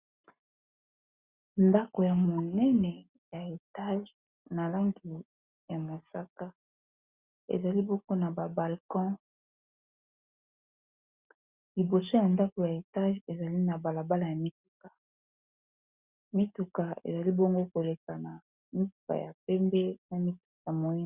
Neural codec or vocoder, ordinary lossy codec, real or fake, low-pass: none; Opus, 64 kbps; real; 3.6 kHz